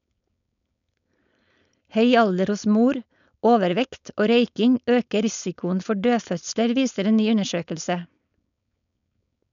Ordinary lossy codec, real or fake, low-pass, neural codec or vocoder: none; fake; 7.2 kHz; codec, 16 kHz, 4.8 kbps, FACodec